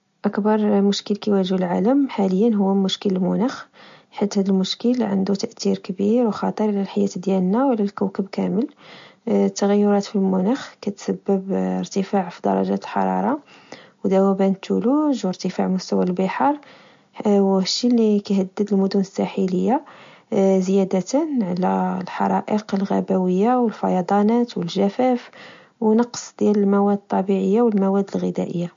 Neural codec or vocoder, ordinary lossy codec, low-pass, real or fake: none; MP3, 48 kbps; 7.2 kHz; real